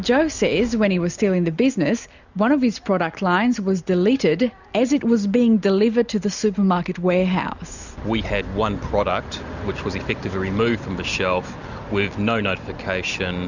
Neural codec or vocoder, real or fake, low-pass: none; real; 7.2 kHz